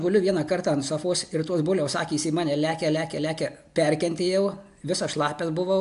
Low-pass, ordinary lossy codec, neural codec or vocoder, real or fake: 10.8 kHz; MP3, 96 kbps; none; real